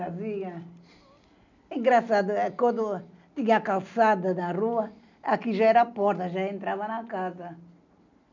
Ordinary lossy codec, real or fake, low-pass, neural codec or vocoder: none; real; 7.2 kHz; none